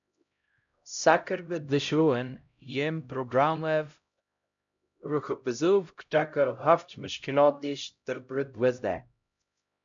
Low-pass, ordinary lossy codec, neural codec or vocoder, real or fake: 7.2 kHz; MP3, 64 kbps; codec, 16 kHz, 0.5 kbps, X-Codec, HuBERT features, trained on LibriSpeech; fake